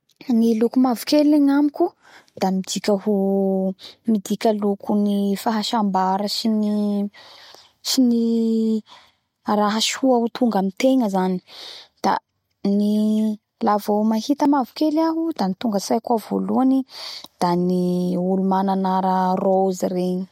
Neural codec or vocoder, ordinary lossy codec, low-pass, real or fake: none; MP3, 64 kbps; 19.8 kHz; real